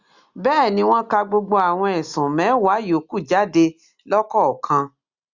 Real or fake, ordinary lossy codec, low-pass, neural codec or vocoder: real; Opus, 64 kbps; 7.2 kHz; none